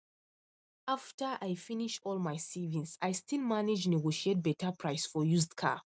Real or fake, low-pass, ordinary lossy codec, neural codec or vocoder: real; none; none; none